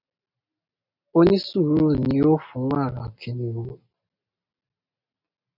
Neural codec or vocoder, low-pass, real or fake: none; 5.4 kHz; real